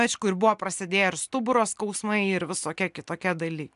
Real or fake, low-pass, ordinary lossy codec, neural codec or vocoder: real; 10.8 kHz; AAC, 96 kbps; none